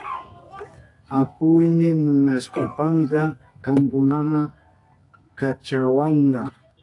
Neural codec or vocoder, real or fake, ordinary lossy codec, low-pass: codec, 24 kHz, 0.9 kbps, WavTokenizer, medium music audio release; fake; AAC, 48 kbps; 10.8 kHz